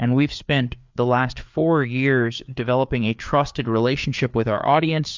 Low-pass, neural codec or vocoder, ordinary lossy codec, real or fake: 7.2 kHz; codec, 16 kHz, 4 kbps, FreqCodec, larger model; MP3, 64 kbps; fake